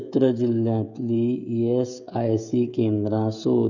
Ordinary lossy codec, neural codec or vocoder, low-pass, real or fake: none; codec, 16 kHz, 16 kbps, FreqCodec, smaller model; 7.2 kHz; fake